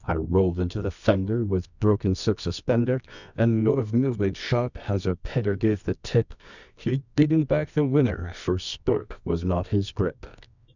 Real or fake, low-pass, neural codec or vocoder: fake; 7.2 kHz; codec, 24 kHz, 0.9 kbps, WavTokenizer, medium music audio release